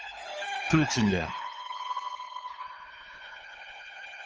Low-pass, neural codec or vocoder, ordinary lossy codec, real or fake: 7.2 kHz; codec, 16 kHz, 8 kbps, FunCodec, trained on LibriTTS, 25 frames a second; Opus, 24 kbps; fake